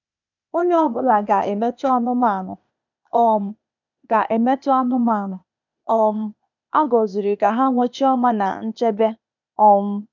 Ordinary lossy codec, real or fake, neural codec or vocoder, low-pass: none; fake; codec, 16 kHz, 0.8 kbps, ZipCodec; 7.2 kHz